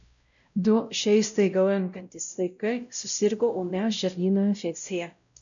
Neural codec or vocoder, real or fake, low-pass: codec, 16 kHz, 0.5 kbps, X-Codec, WavLM features, trained on Multilingual LibriSpeech; fake; 7.2 kHz